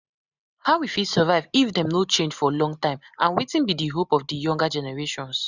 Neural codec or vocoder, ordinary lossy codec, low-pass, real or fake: none; none; 7.2 kHz; real